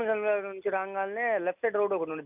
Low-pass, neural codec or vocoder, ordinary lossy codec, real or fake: 3.6 kHz; none; none; real